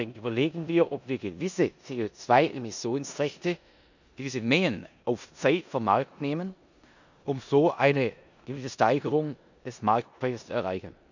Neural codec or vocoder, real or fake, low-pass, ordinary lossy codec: codec, 16 kHz in and 24 kHz out, 0.9 kbps, LongCat-Audio-Codec, four codebook decoder; fake; 7.2 kHz; none